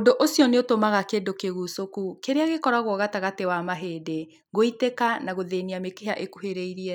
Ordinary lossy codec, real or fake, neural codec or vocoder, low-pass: none; real; none; none